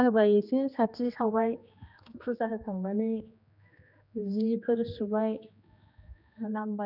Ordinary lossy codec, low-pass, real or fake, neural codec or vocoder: none; 5.4 kHz; fake; codec, 16 kHz, 2 kbps, X-Codec, HuBERT features, trained on general audio